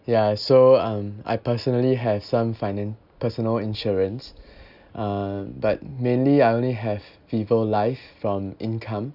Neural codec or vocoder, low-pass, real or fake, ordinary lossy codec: none; 5.4 kHz; real; none